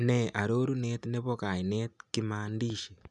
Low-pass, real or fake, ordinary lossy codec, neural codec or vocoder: 10.8 kHz; real; none; none